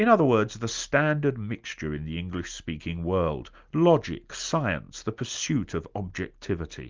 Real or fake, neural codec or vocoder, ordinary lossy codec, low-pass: real; none; Opus, 24 kbps; 7.2 kHz